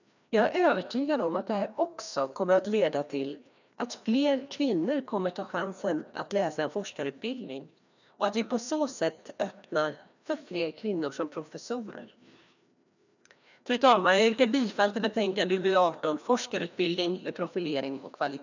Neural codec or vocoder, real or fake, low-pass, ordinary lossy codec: codec, 16 kHz, 1 kbps, FreqCodec, larger model; fake; 7.2 kHz; none